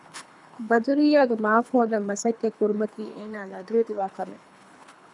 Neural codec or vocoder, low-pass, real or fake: codec, 24 kHz, 3 kbps, HILCodec; 10.8 kHz; fake